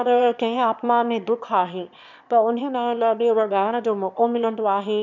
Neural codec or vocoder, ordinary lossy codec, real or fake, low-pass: autoencoder, 22.05 kHz, a latent of 192 numbers a frame, VITS, trained on one speaker; none; fake; 7.2 kHz